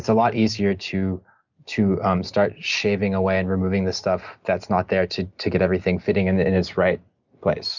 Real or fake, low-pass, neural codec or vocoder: real; 7.2 kHz; none